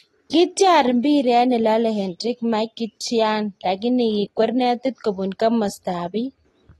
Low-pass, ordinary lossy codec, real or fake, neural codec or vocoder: 19.8 kHz; AAC, 32 kbps; real; none